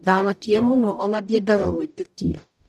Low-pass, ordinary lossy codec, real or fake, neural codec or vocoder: 14.4 kHz; none; fake; codec, 44.1 kHz, 0.9 kbps, DAC